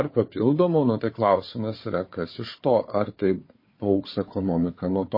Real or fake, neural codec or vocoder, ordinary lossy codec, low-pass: fake; codec, 16 kHz, 4 kbps, FunCodec, trained on Chinese and English, 50 frames a second; MP3, 24 kbps; 5.4 kHz